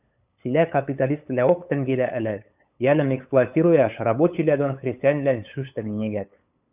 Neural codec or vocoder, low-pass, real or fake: codec, 16 kHz, 8 kbps, FunCodec, trained on LibriTTS, 25 frames a second; 3.6 kHz; fake